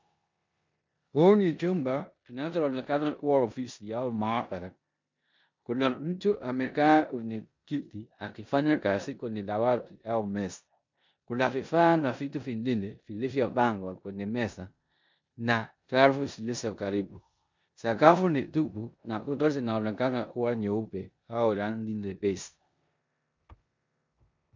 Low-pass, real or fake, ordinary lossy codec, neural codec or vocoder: 7.2 kHz; fake; MP3, 64 kbps; codec, 16 kHz in and 24 kHz out, 0.9 kbps, LongCat-Audio-Codec, four codebook decoder